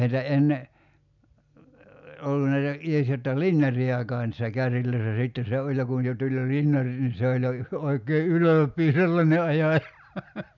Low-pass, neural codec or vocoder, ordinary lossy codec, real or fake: 7.2 kHz; none; none; real